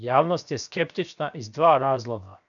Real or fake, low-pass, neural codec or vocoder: fake; 7.2 kHz; codec, 16 kHz, 0.7 kbps, FocalCodec